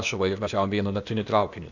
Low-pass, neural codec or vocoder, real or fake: 7.2 kHz; codec, 16 kHz, 0.8 kbps, ZipCodec; fake